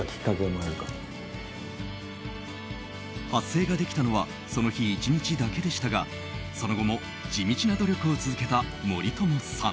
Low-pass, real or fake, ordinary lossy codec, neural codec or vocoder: none; real; none; none